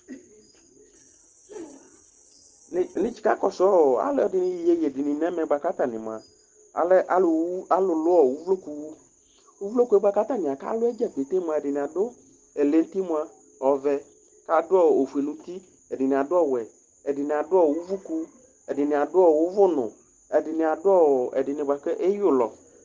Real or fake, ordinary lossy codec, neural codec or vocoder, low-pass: real; Opus, 16 kbps; none; 7.2 kHz